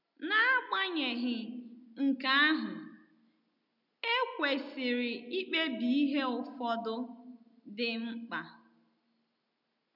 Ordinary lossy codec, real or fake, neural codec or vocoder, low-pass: none; real; none; 5.4 kHz